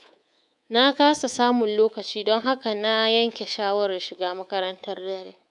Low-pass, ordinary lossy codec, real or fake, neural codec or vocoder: 10.8 kHz; none; fake; codec, 24 kHz, 3.1 kbps, DualCodec